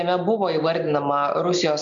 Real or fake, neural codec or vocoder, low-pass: real; none; 7.2 kHz